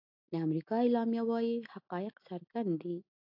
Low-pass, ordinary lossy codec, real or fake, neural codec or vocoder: 5.4 kHz; AAC, 48 kbps; real; none